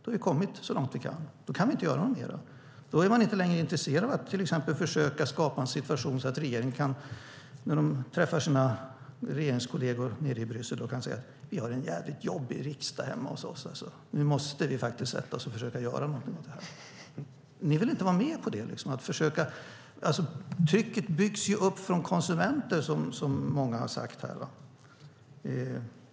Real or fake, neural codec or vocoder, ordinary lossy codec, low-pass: real; none; none; none